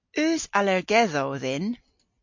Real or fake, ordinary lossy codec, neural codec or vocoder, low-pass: real; MP3, 48 kbps; none; 7.2 kHz